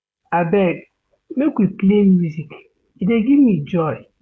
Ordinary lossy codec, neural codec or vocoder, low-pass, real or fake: none; codec, 16 kHz, 16 kbps, FreqCodec, smaller model; none; fake